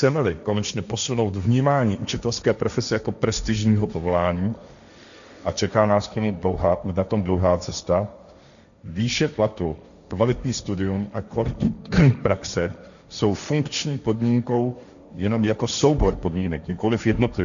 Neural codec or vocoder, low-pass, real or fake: codec, 16 kHz, 1.1 kbps, Voila-Tokenizer; 7.2 kHz; fake